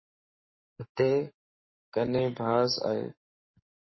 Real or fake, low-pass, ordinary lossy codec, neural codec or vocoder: fake; 7.2 kHz; MP3, 24 kbps; codec, 44.1 kHz, 7.8 kbps, Pupu-Codec